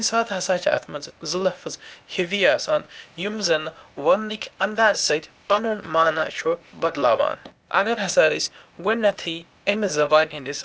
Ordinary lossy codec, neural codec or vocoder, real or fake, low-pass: none; codec, 16 kHz, 0.8 kbps, ZipCodec; fake; none